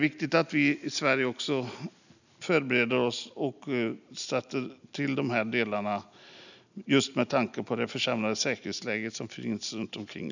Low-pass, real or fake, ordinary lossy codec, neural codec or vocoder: 7.2 kHz; real; none; none